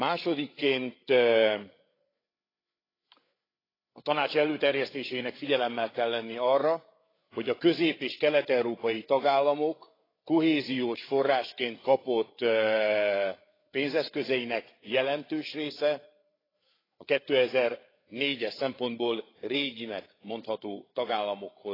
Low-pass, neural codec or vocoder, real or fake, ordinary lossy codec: 5.4 kHz; codec, 16 kHz, 8 kbps, FreqCodec, larger model; fake; AAC, 24 kbps